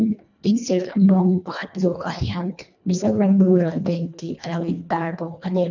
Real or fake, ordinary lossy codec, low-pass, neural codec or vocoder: fake; none; 7.2 kHz; codec, 24 kHz, 1.5 kbps, HILCodec